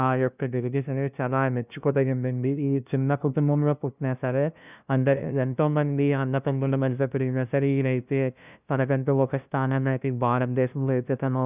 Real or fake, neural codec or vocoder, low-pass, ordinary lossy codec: fake; codec, 16 kHz, 0.5 kbps, FunCodec, trained on LibriTTS, 25 frames a second; 3.6 kHz; none